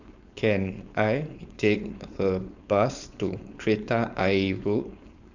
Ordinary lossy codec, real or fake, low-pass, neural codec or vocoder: none; fake; 7.2 kHz; codec, 16 kHz, 4.8 kbps, FACodec